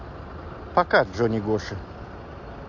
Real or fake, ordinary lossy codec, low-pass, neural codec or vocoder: real; MP3, 48 kbps; 7.2 kHz; none